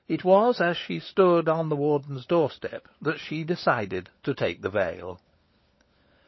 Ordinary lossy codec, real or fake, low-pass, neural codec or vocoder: MP3, 24 kbps; fake; 7.2 kHz; codec, 16 kHz, 16 kbps, FunCodec, trained on LibriTTS, 50 frames a second